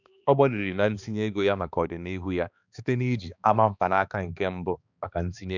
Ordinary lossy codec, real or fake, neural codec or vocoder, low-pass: none; fake; codec, 16 kHz, 2 kbps, X-Codec, HuBERT features, trained on balanced general audio; 7.2 kHz